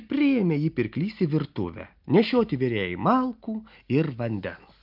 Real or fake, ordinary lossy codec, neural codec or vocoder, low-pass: real; Opus, 32 kbps; none; 5.4 kHz